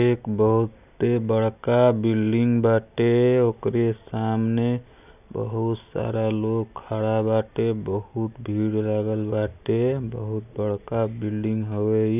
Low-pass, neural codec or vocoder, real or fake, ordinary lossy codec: 3.6 kHz; none; real; none